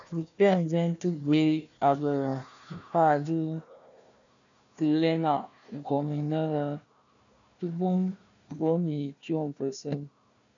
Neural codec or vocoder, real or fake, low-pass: codec, 16 kHz, 1 kbps, FunCodec, trained on Chinese and English, 50 frames a second; fake; 7.2 kHz